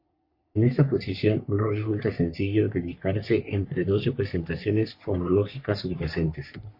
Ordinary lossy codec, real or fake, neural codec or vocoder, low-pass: MP3, 32 kbps; fake; codec, 44.1 kHz, 3.4 kbps, Pupu-Codec; 5.4 kHz